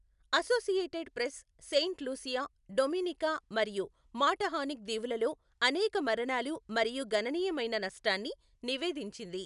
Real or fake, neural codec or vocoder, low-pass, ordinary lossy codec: real; none; 10.8 kHz; none